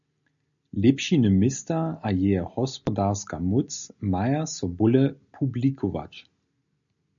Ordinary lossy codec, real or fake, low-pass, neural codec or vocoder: MP3, 96 kbps; real; 7.2 kHz; none